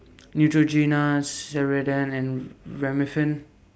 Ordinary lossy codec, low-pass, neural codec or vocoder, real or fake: none; none; none; real